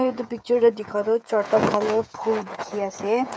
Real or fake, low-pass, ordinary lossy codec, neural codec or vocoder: fake; none; none; codec, 16 kHz, 16 kbps, FreqCodec, smaller model